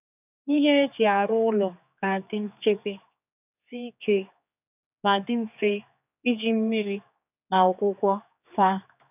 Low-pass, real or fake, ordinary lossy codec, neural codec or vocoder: 3.6 kHz; fake; none; codec, 16 kHz, 4 kbps, X-Codec, HuBERT features, trained on general audio